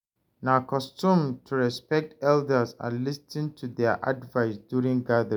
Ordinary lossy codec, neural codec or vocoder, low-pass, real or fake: none; none; none; real